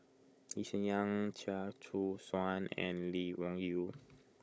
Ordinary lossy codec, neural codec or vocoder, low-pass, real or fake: none; codec, 16 kHz, 16 kbps, FunCodec, trained on Chinese and English, 50 frames a second; none; fake